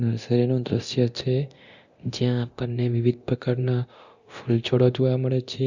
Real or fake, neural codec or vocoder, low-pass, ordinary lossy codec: fake; codec, 24 kHz, 0.9 kbps, DualCodec; 7.2 kHz; Opus, 64 kbps